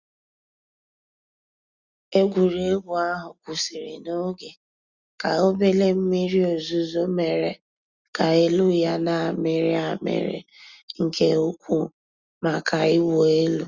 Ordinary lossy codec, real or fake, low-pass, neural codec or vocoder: Opus, 64 kbps; real; 7.2 kHz; none